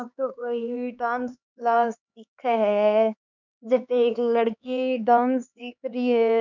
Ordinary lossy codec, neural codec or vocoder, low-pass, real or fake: none; codec, 16 kHz, 4 kbps, X-Codec, HuBERT features, trained on LibriSpeech; 7.2 kHz; fake